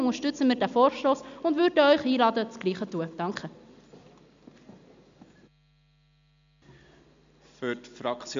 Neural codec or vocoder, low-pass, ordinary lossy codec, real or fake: none; 7.2 kHz; MP3, 64 kbps; real